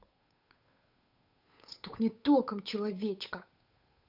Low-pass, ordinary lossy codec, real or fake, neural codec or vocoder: 5.4 kHz; none; fake; codec, 16 kHz, 8 kbps, FunCodec, trained on Chinese and English, 25 frames a second